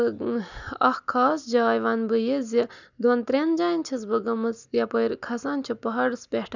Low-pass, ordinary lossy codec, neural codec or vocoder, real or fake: 7.2 kHz; none; none; real